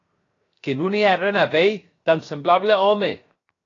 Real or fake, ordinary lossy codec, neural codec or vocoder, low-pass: fake; AAC, 32 kbps; codec, 16 kHz, 0.7 kbps, FocalCodec; 7.2 kHz